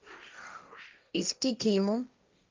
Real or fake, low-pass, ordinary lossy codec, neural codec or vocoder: fake; 7.2 kHz; Opus, 24 kbps; codec, 16 kHz, 1.1 kbps, Voila-Tokenizer